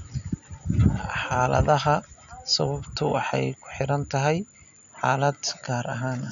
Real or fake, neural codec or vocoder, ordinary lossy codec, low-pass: real; none; none; 7.2 kHz